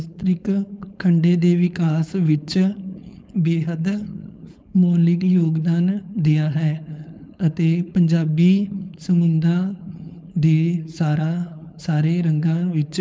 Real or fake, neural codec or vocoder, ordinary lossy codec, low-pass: fake; codec, 16 kHz, 4.8 kbps, FACodec; none; none